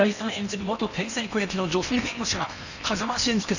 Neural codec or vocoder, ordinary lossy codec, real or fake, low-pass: codec, 16 kHz in and 24 kHz out, 0.8 kbps, FocalCodec, streaming, 65536 codes; none; fake; 7.2 kHz